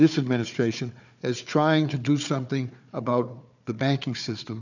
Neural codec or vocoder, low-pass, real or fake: codec, 16 kHz, 4 kbps, FunCodec, trained on Chinese and English, 50 frames a second; 7.2 kHz; fake